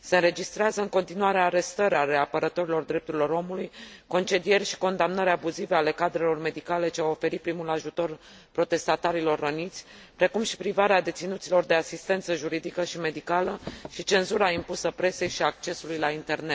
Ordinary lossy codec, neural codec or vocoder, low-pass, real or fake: none; none; none; real